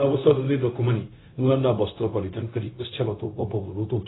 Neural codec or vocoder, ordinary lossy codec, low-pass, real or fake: codec, 16 kHz, 0.4 kbps, LongCat-Audio-Codec; AAC, 16 kbps; 7.2 kHz; fake